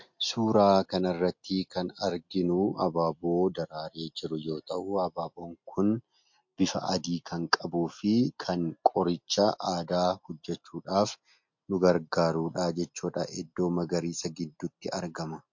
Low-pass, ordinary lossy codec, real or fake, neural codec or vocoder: 7.2 kHz; MP3, 48 kbps; real; none